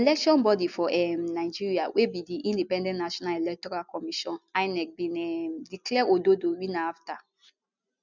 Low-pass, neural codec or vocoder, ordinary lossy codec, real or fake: 7.2 kHz; none; none; real